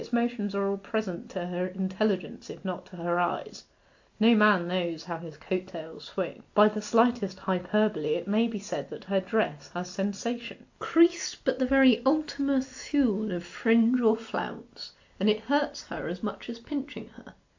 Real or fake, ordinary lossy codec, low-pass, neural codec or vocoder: real; AAC, 48 kbps; 7.2 kHz; none